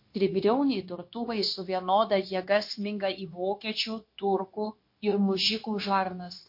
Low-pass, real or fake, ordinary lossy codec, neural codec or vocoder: 5.4 kHz; fake; MP3, 32 kbps; codec, 16 kHz, 0.9 kbps, LongCat-Audio-Codec